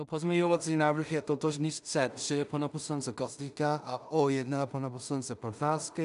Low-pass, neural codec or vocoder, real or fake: 10.8 kHz; codec, 16 kHz in and 24 kHz out, 0.4 kbps, LongCat-Audio-Codec, two codebook decoder; fake